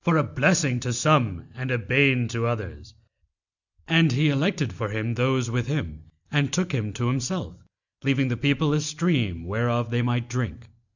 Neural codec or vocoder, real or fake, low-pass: none; real; 7.2 kHz